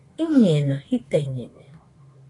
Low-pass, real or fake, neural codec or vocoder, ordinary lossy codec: 10.8 kHz; fake; autoencoder, 48 kHz, 32 numbers a frame, DAC-VAE, trained on Japanese speech; AAC, 32 kbps